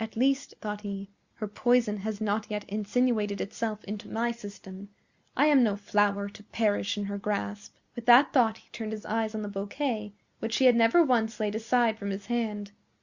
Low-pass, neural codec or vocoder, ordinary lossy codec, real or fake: 7.2 kHz; none; Opus, 64 kbps; real